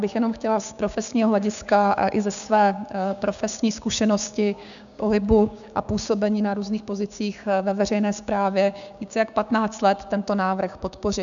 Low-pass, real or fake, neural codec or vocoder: 7.2 kHz; fake; codec, 16 kHz, 6 kbps, DAC